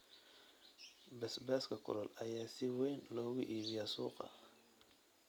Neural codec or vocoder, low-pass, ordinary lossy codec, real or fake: none; none; none; real